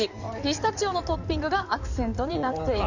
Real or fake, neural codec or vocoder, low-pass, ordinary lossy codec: fake; codec, 16 kHz in and 24 kHz out, 2.2 kbps, FireRedTTS-2 codec; 7.2 kHz; none